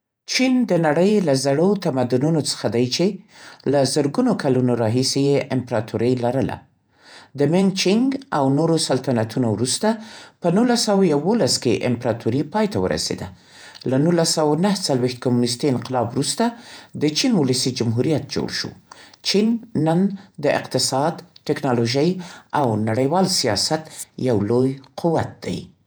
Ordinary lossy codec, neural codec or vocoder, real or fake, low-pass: none; vocoder, 48 kHz, 128 mel bands, Vocos; fake; none